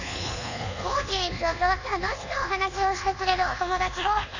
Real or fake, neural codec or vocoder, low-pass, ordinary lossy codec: fake; codec, 24 kHz, 1.2 kbps, DualCodec; 7.2 kHz; none